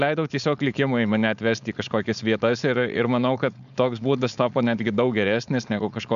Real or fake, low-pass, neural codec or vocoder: fake; 7.2 kHz; codec, 16 kHz, 4.8 kbps, FACodec